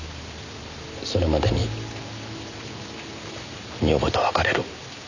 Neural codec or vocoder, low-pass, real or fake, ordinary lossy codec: none; 7.2 kHz; real; none